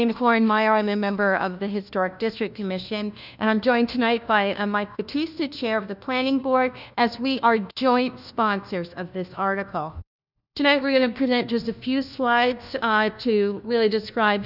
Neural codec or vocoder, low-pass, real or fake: codec, 16 kHz, 1 kbps, FunCodec, trained on LibriTTS, 50 frames a second; 5.4 kHz; fake